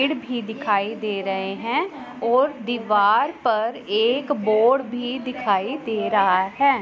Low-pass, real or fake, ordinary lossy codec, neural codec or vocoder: none; real; none; none